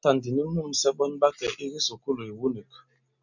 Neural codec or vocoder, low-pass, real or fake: none; 7.2 kHz; real